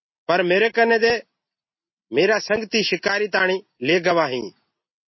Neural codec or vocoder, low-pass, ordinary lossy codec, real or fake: none; 7.2 kHz; MP3, 24 kbps; real